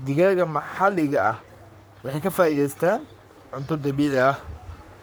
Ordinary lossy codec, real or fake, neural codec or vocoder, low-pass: none; fake; codec, 44.1 kHz, 3.4 kbps, Pupu-Codec; none